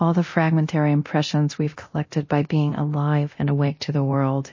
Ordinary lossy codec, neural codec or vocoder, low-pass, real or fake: MP3, 32 kbps; codec, 24 kHz, 0.9 kbps, DualCodec; 7.2 kHz; fake